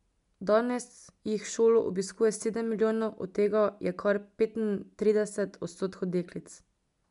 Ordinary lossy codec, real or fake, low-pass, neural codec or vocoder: none; real; 10.8 kHz; none